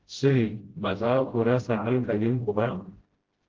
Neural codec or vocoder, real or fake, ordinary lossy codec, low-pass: codec, 16 kHz, 0.5 kbps, FreqCodec, smaller model; fake; Opus, 16 kbps; 7.2 kHz